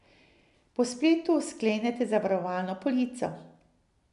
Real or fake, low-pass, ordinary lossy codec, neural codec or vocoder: real; 10.8 kHz; none; none